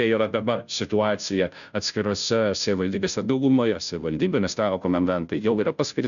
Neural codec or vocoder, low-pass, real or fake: codec, 16 kHz, 0.5 kbps, FunCodec, trained on Chinese and English, 25 frames a second; 7.2 kHz; fake